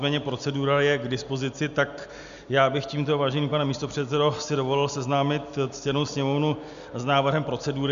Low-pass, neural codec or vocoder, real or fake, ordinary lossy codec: 7.2 kHz; none; real; MP3, 96 kbps